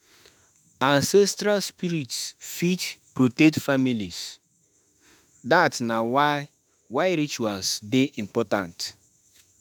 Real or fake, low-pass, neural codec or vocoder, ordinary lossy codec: fake; none; autoencoder, 48 kHz, 32 numbers a frame, DAC-VAE, trained on Japanese speech; none